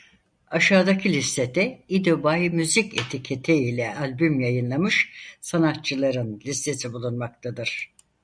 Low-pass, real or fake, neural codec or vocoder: 9.9 kHz; real; none